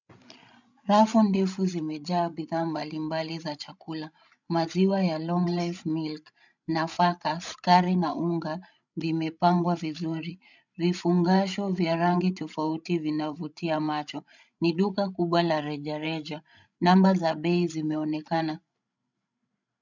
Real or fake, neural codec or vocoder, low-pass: fake; codec, 16 kHz, 16 kbps, FreqCodec, larger model; 7.2 kHz